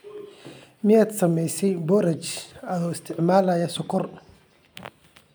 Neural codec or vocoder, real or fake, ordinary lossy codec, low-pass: none; real; none; none